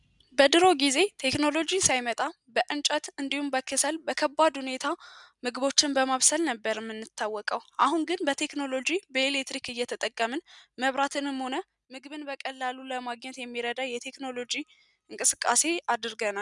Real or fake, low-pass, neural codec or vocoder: real; 10.8 kHz; none